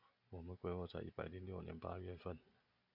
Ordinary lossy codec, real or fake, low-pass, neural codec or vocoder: MP3, 48 kbps; real; 5.4 kHz; none